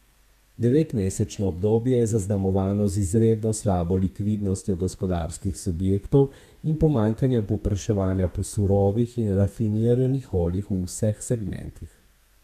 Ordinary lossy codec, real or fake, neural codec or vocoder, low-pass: MP3, 96 kbps; fake; codec, 32 kHz, 1.9 kbps, SNAC; 14.4 kHz